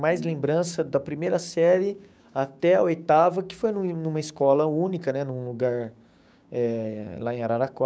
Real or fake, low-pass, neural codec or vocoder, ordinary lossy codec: fake; none; codec, 16 kHz, 6 kbps, DAC; none